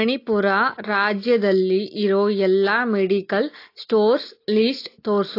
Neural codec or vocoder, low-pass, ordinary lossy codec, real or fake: none; 5.4 kHz; AAC, 32 kbps; real